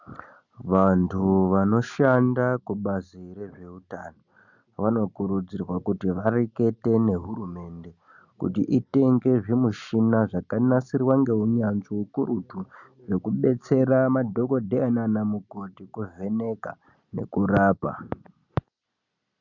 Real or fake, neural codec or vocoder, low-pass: real; none; 7.2 kHz